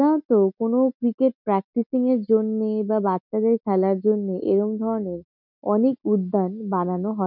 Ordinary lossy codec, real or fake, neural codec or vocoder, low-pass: none; real; none; 5.4 kHz